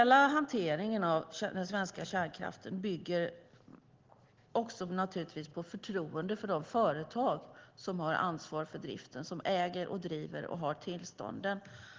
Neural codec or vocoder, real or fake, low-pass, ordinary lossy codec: none; real; 7.2 kHz; Opus, 16 kbps